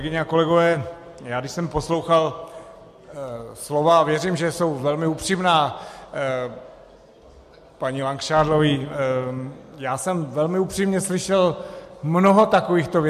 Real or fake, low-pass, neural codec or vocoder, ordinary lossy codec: real; 14.4 kHz; none; MP3, 64 kbps